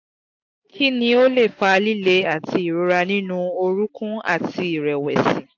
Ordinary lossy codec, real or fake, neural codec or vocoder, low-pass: AAC, 48 kbps; real; none; 7.2 kHz